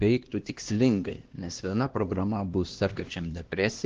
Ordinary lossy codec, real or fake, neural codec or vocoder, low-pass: Opus, 16 kbps; fake; codec, 16 kHz, 1 kbps, X-Codec, HuBERT features, trained on LibriSpeech; 7.2 kHz